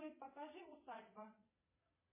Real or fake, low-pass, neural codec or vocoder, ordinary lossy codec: fake; 3.6 kHz; vocoder, 22.05 kHz, 80 mel bands, Vocos; MP3, 16 kbps